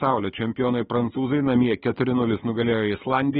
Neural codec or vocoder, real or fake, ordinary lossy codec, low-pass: codec, 16 kHz, 6 kbps, DAC; fake; AAC, 16 kbps; 7.2 kHz